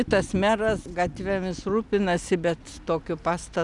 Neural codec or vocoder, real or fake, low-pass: none; real; 10.8 kHz